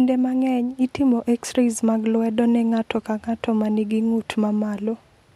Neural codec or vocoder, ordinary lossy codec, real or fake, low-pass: none; MP3, 64 kbps; real; 19.8 kHz